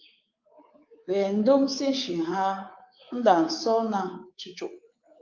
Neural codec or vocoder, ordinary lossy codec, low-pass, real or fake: codec, 24 kHz, 3.1 kbps, DualCodec; Opus, 24 kbps; 7.2 kHz; fake